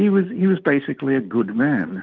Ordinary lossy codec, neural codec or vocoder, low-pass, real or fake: Opus, 24 kbps; none; 7.2 kHz; real